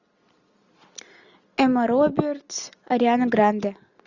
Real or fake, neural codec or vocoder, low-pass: real; none; 7.2 kHz